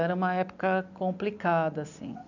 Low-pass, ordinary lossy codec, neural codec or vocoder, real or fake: 7.2 kHz; none; none; real